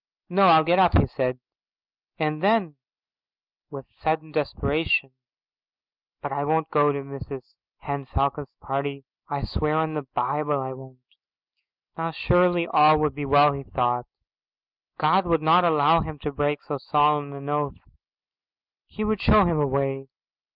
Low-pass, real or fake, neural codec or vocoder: 5.4 kHz; real; none